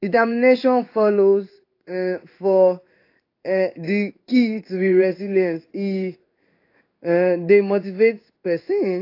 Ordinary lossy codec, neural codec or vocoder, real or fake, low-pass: AAC, 32 kbps; codec, 16 kHz in and 24 kHz out, 1 kbps, XY-Tokenizer; fake; 5.4 kHz